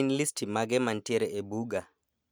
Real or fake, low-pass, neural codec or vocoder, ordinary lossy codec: real; none; none; none